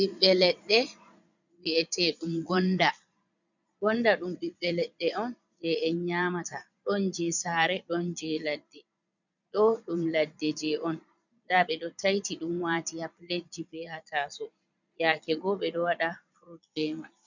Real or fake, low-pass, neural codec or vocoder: fake; 7.2 kHz; vocoder, 22.05 kHz, 80 mel bands, Vocos